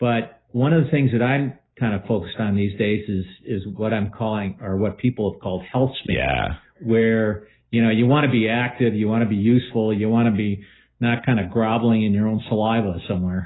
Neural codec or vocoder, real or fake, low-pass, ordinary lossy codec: none; real; 7.2 kHz; AAC, 16 kbps